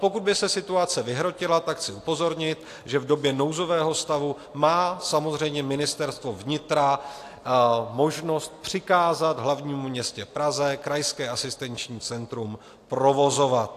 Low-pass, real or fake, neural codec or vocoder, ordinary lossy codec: 14.4 kHz; real; none; AAC, 64 kbps